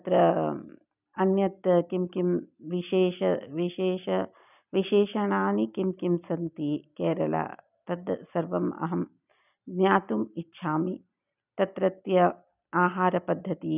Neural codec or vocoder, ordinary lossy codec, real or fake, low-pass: none; none; real; 3.6 kHz